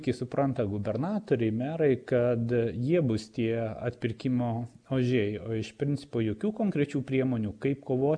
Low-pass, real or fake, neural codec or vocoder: 9.9 kHz; real; none